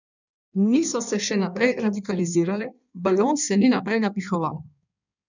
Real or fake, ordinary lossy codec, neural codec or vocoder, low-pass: fake; none; codec, 16 kHz in and 24 kHz out, 1.1 kbps, FireRedTTS-2 codec; 7.2 kHz